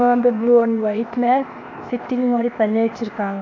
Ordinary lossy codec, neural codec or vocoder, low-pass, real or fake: AAC, 48 kbps; codec, 16 kHz, 0.8 kbps, ZipCodec; 7.2 kHz; fake